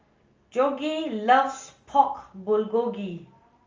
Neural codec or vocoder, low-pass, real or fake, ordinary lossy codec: none; 7.2 kHz; real; Opus, 32 kbps